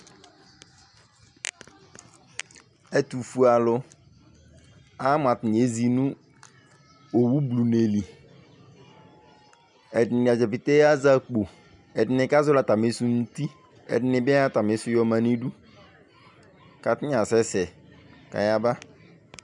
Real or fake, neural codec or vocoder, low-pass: real; none; 10.8 kHz